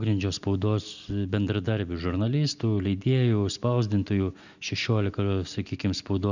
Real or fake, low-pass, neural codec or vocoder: real; 7.2 kHz; none